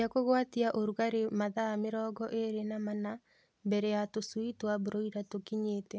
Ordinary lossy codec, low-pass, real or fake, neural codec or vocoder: none; none; real; none